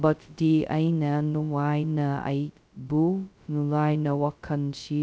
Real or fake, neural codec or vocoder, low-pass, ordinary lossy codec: fake; codec, 16 kHz, 0.2 kbps, FocalCodec; none; none